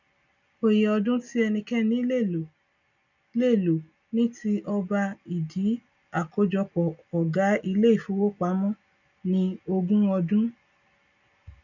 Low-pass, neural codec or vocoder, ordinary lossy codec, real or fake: 7.2 kHz; none; none; real